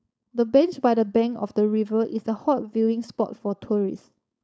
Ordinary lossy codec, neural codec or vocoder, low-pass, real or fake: none; codec, 16 kHz, 4.8 kbps, FACodec; none; fake